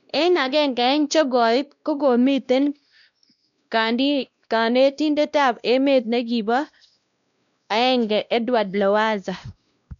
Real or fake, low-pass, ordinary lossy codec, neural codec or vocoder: fake; 7.2 kHz; none; codec, 16 kHz, 1 kbps, X-Codec, WavLM features, trained on Multilingual LibriSpeech